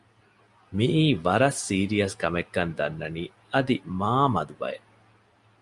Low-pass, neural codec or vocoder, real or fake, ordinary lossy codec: 10.8 kHz; none; real; Opus, 64 kbps